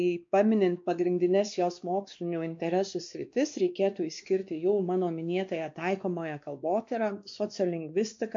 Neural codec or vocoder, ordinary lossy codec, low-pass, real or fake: codec, 16 kHz, 2 kbps, X-Codec, WavLM features, trained on Multilingual LibriSpeech; MP3, 48 kbps; 7.2 kHz; fake